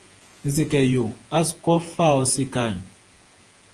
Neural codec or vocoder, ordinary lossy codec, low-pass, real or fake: vocoder, 48 kHz, 128 mel bands, Vocos; Opus, 24 kbps; 10.8 kHz; fake